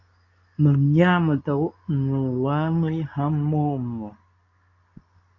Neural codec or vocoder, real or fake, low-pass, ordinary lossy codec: codec, 24 kHz, 0.9 kbps, WavTokenizer, medium speech release version 2; fake; 7.2 kHz; AAC, 48 kbps